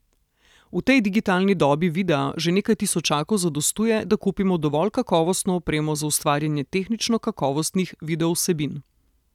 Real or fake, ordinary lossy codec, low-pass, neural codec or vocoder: real; none; 19.8 kHz; none